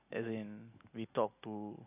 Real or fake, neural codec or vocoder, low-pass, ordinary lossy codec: real; none; 3.6 kHz; AAC, 24 kbps